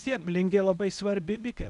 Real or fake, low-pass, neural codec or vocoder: fake; 10.8 kHz; codec, 24 kHz, 0.9 kbps, WavTokenizer, medium speech release version 1